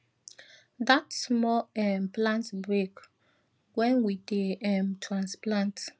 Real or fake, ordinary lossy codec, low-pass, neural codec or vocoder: real; none; none; none